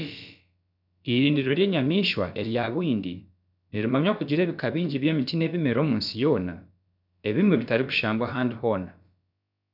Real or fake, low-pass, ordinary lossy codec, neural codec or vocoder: fake; 5.4 kHz; none; codec, 16 kHz, about 1 kbps, DyCAST, with the encoder's durations